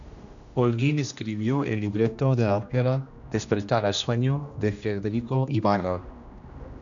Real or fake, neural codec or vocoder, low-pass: fake; codec, 16 kHz, 1 kbps, X-Codec, HuBERT features, trained on general audio; 7.2 kHz